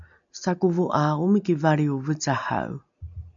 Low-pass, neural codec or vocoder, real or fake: 7.2 kHz; none; real